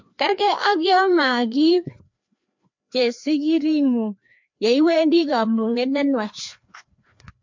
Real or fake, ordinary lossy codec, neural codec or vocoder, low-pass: fake; MP3, 48 kbps; codec, 16 kHz, 2 kbps, FreqCodec, larger model; 7.2 kHz